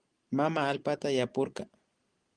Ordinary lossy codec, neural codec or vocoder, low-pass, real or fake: Opus, 24 kbps; none; 9.9 kHz; real